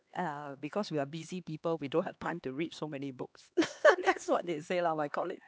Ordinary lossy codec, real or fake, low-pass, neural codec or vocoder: none; fake; none; codec, 16 kHz, 2 kbps, X-Codec, HuBERT features, trained on LibriSpeech